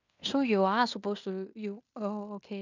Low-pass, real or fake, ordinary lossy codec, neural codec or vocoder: 7.2 kHz; fake; none; codec, 16 kHz in and 24 kHz out, 0.9 kbps, LongCat-Audio-Codec, fine tuned four codebook decoder